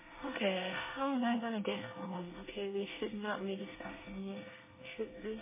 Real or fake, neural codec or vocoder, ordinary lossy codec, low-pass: fake; codec, 24 kHz, 1 kbps, SNAC; MP3, 16 kbps; 3.6 kHz